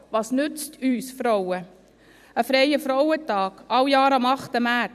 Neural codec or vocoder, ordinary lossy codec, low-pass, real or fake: vocoder, 44.1 kHz, 128 mel bands every 256 samples, BigVGAN v2; none; 14.4 kHz; fake